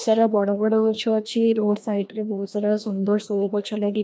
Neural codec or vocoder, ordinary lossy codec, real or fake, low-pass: codec, 16 kHz, 1 kbps, FreqCodec, larger model; none; fake; none